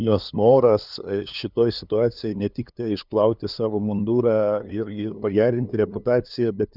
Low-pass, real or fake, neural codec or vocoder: 5.4 kHz; fake; codec, 16 kHz, 2 kbps, FunCodec, trained on LibriTTS, 25 frames a second